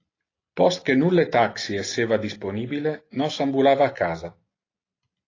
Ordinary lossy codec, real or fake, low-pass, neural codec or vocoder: AAC, 32 kbps; real; 7.2 kHz; none